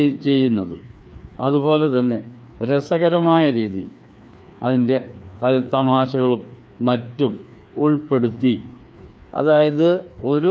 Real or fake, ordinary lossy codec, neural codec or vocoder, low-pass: fake; none; codec, 16 kHz, 2 kbps, FreqCodec, larger model; none